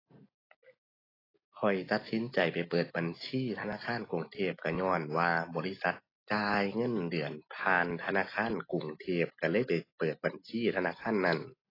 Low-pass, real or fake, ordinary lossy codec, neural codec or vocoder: 5.4 kHz; real; AAC, 24 kbps; none